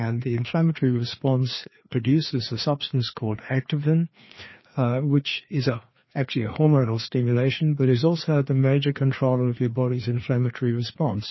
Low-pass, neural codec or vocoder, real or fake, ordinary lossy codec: 7.2 kHz; codec, 16 kHz, 2 kbps, FreqCodec, larger model; fake; MP3, 24 kbps